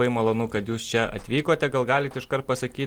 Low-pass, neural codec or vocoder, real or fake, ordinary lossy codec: 19.8 kHz; none; real; Opus, 24 kbps